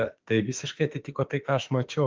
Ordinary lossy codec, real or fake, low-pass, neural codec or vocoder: Opus, 24 kbps; fake; 7.2 kHz; autoencoder, 48 kHz, 32 numbers a frame, DAC-VAE, trained on Japanese speech